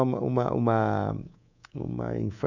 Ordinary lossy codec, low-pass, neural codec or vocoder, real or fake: none; 7.2 kHz; codec, 24 kHz, 3.1 kbps, DualCodec; fake